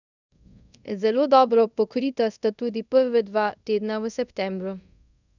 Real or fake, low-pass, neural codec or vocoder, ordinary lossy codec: fake; 7.2 kHz; codec, 24 kHz, 0.5 kbps, DualCodec; none